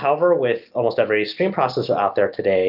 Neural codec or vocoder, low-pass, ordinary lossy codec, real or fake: none; 5.4 kHz; Opus, 24 kbps; real